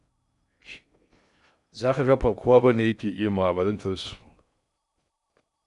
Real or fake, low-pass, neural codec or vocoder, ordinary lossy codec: fake; 10.8 kHz; codec, 16 kHz in and 24 kHz out, 0.6 kbps, FocalCodec, streaming, 2048 codes; none